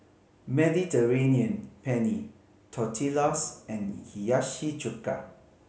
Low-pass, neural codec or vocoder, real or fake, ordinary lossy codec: none; none; real; none